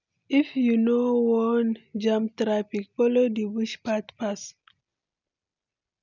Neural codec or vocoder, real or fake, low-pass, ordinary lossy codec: none; real; 7.2 kHz; none